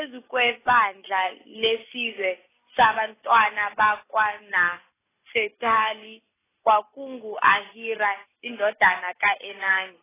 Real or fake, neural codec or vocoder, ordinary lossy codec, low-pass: real; none; AAC, 16 kbps; 3.6 kHz